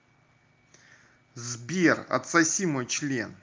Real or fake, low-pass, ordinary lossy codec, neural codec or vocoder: real; 7.2 kHz; Opus, 32 kbps; none